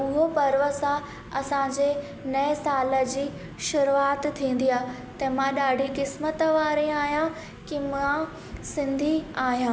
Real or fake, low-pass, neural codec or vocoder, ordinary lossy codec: real; none; none; none